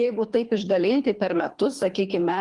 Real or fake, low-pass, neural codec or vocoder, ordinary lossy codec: fake; 10.8 kHz; codec, 24 kHz, 3 kbps, HILCodec; Opus, 24 kbps